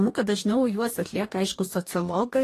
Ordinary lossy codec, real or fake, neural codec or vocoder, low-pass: AAC, 48 kbps; fake; codec, 44.1 kHz, 2.6 kbps, DAC; 14.4 kHz